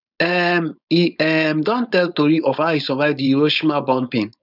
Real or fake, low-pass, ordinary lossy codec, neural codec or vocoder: fake; 5.4 kHz; none; codec, 16 kHz, 4.8 kbps, FACodec